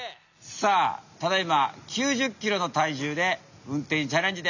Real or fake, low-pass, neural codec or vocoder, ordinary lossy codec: real; 7.2 kHz; none; none